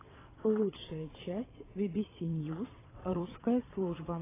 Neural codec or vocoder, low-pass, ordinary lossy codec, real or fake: vocoder, 22.05 kHz, 80 mel bands, WaveNeXt; 3.6 kHz; AAC, 16 kbps; fake